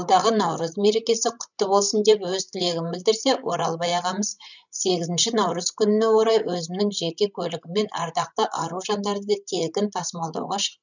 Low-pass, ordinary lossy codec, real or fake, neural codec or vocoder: 7.2 kHz; none; fake; codec, 16 kHz, 16 kbps, FreqCodec, larger model